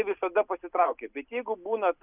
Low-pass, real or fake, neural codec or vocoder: 3.6 kHz; real; none